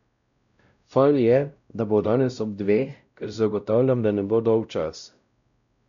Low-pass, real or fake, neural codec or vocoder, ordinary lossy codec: 7.2 kHz; fake; codec, 16 kHz, 0.5 kbps, X-Codec, WavLM features, trained on Multilingual LibriSpeech; none